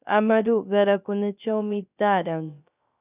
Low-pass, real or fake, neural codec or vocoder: 3.6 kHz; fake; codec, 16 kHz, 0.3 kbps, FocalCodec